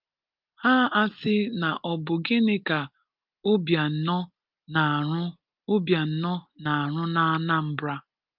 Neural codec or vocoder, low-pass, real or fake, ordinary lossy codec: none; 5.4 kHz; real; Opus, 24 kbps